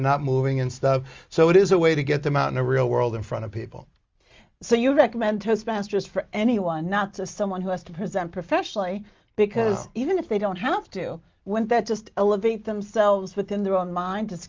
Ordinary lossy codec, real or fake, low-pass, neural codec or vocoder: Opus, 32 kbps; real; 7.2 kHz; none